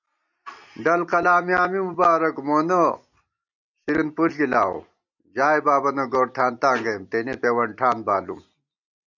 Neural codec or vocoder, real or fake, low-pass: none; real; 7.2 kHz